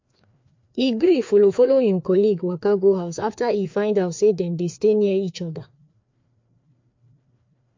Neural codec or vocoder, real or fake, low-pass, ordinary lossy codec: codec, 16 kHz, 2 kbps, FreqCodec, larger model; fake; 7.2 kHz; MP3, 48 kbps